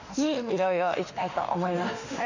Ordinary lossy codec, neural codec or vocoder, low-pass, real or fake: none; autoencoder, 48 kHz, 32 numbers a frame, DAC-VAE, trained on Japanese speech; 7.2 kHz; fake